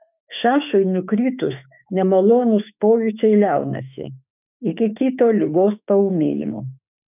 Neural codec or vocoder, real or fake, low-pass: autoencoder, 48 kHz, 32 numbers a frame, DAC-VAE, trained on Japanese speech; fake; 3.6 kHz